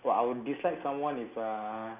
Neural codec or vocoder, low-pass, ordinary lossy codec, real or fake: none; 3.6 kHz; none; real